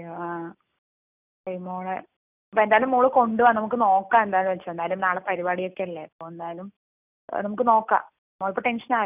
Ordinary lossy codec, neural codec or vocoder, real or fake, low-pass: none; none; real; 3.6 kHz